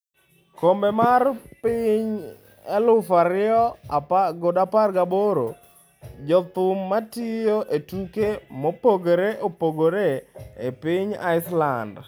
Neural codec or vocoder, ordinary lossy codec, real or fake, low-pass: none; none; real; none